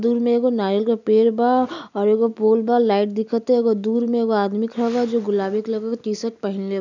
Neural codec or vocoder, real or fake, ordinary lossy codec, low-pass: none; real; none; 7.2 kHz